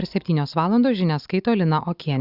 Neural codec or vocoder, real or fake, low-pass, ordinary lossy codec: none; real; 5.4 kHz; Opus, 64 kbps